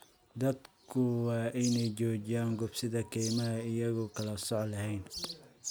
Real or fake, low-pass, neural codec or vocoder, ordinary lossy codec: real; none; none; none